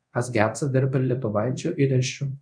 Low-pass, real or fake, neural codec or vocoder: 9.9 kHz; fake; codec, 24 kHz, 0.5 kbps, DualCodec